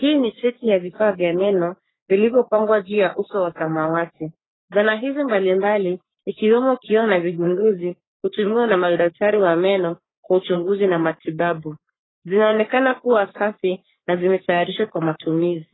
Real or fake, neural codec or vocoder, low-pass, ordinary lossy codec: fake; codec, 44.1 kHz, 3.4 kbps, Pupu-Codec; 7.2 kHz; AAC, 16 kbps